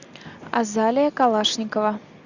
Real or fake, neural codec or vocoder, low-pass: real; none; 7.2 kHz